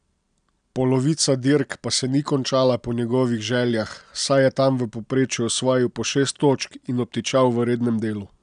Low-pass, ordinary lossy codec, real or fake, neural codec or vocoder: 9.9 kHz; none; real; none